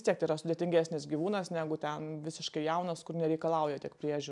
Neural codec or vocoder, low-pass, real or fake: none; 10.8 kHz; real